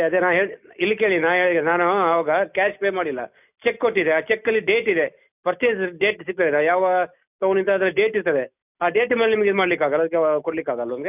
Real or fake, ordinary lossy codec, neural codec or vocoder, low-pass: real; none; none; 3.6 kHz